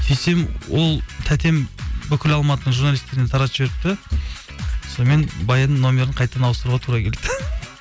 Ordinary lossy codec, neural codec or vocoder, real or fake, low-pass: none; none; real; none